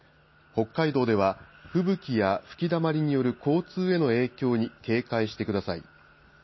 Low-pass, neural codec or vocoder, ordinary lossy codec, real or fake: 7.2 kHz; none; MP3, 24 kbps; real